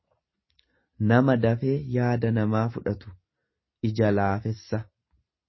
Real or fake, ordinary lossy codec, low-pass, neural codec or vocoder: real; MP3, 24 kbps; 7.2 kHz; none